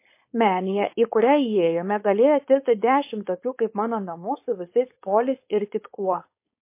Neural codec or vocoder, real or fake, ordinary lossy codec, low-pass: codec, 16 kHz, 4.8 kbps, FACodec; fake; MP3, 24 kbps; 3.6 kHz